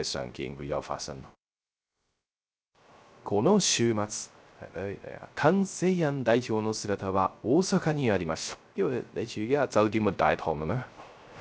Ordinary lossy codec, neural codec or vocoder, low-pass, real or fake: none; codec, 16 kHz, 0.3 kbps, FocalCodec; none; fake